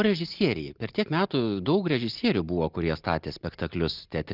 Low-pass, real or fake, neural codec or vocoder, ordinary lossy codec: 5.4 kHz; real; none; Opus, 24 kbps